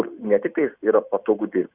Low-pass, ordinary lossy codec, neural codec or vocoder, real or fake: 3.6 kHz; Opus, 64 kbps; none; real